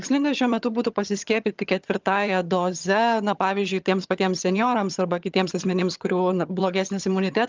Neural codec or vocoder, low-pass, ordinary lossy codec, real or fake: vocoder, 22.05 kHz, 80 mel bands, HiFi-GAN; 7.2 kHz; Opus, 24 kbps; fake